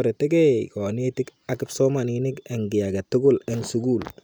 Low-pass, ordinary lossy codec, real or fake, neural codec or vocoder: none; none; real; none